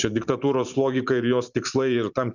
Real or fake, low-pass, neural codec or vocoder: fake; 7.2 kHz; vocoder, 44.1 kHz, 128 mel bands every 512 samples, BigVGAN v2